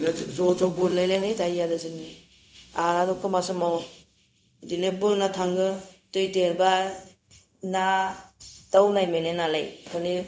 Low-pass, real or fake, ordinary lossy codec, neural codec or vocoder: none; fake; none; codec, 16 kHz, 0.4 kbps, LongCat-Audio-Codec